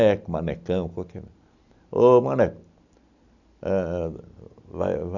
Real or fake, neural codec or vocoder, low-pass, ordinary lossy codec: real; none; 7.2 kHz; none